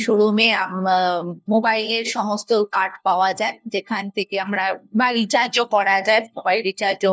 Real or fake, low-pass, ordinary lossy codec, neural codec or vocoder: fake; none; none; codec, 16 kHz, 1 kbps, FunCodec, trained on LibriTTS, 50 frames a second